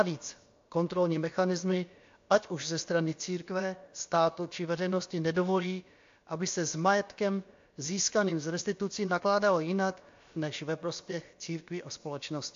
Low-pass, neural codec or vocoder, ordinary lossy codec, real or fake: 7.2 kHz; codec, 16 kHz, about 1 kbps, DyCAST, with the encoder's durations; AAC, 48 kbps; fake